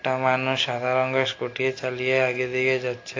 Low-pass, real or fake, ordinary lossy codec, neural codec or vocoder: 7.2 kHz; real; AAC, 32 kbps; none